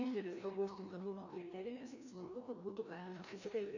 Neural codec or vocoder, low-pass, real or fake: codec, 16 kHz, 1 kbps, FreqCodec, larger model; 7.2 kHz; fake